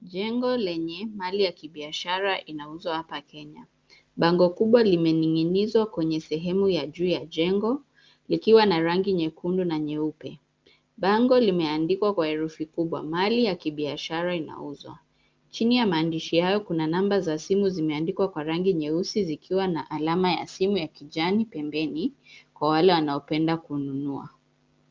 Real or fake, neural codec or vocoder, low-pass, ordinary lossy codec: real; none; 7.2 kHz; Opus, 32 kbps